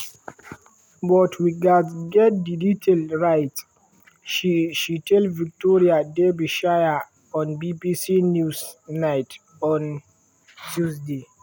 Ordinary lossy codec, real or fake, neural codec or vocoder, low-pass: none; real; none; 19.8 kHz